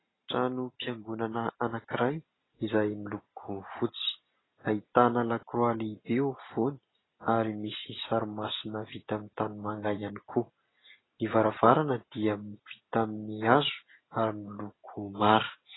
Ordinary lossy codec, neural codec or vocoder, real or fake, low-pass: AAC, 16 kbps; none; real; 7.2 kHz